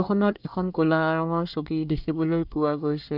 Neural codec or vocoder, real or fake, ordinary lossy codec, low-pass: codec, 24 kHz, 1 kbps, SNAC; fake; none; 5.4 kHz